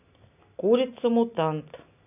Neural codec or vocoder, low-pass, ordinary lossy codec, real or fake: none; 3.6 kHz; none; real